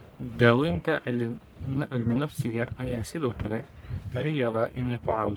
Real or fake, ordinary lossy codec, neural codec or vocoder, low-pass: fake; none; codec, 44.1 kHz, 1.7 kbps, Pupu-Codec; none